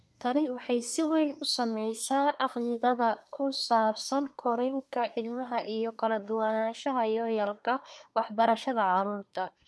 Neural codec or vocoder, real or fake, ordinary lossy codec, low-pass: codec, 24 kHz, 1 kbps, SNAC; fake; none; none